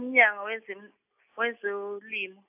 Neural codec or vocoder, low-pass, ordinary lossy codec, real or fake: none; 3.6 kHz; AAC, 32 kbps; real